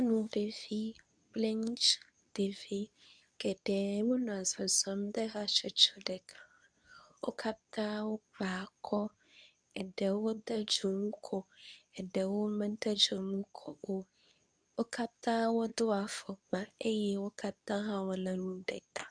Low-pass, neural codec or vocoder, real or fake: 9.9 kHz; codec, 24 kHz, 0.9 kbps, WavTokenizer, medium speech release version 2; fake